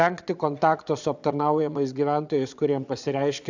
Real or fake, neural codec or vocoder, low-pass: fake; vocoder, 22.05 kHz, 80 mel bands, Vocos; 7.2 kHz